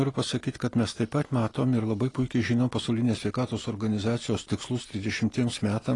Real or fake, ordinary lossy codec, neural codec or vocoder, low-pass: real; AAC, 32 kbps; none; 10.8 kHz